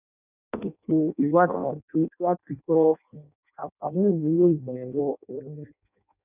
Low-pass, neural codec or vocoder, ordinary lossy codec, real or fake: 3.6 kHz; codec, 16 kHz in and 24 kHz out, 0.6 kbps, FireRedTTS-2 codec; none; fake